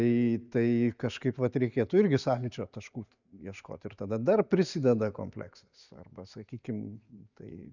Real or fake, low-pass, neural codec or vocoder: real; 7.2 kHz; none